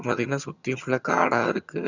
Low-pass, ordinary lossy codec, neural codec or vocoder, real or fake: 7.2 kHz; none; vocoder, 22.05 kHz, 80 mel bands, HiFi-GAN; fake